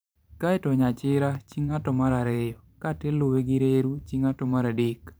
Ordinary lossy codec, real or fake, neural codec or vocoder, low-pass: none; fake; vocoder, 44.1 kHz, 128 mel bands every 512 samples, BigVGAN v2; none